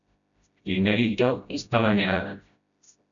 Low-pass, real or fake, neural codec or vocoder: 7.2 kHz; fake; codec, 16 kHz, 0.5 kbps, FreqCodec, smaller model